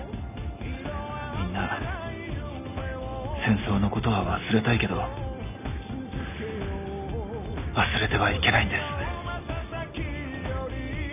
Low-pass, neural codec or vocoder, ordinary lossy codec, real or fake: 3.6 kHz; none; none; real